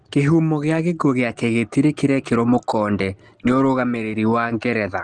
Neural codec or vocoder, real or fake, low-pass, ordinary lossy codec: none; real; 10.8 kHz; Opus, 16 kbps